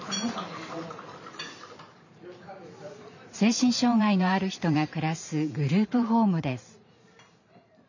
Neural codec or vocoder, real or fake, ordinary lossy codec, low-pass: vocoder, 44.1 kHz, 128 mel bands every 512 samples, BigVGAN v2; fake; none; 7.2 kHz